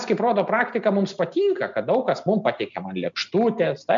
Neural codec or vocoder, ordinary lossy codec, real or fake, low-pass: none; MP3, 64 kbps; real; 7.2 kHz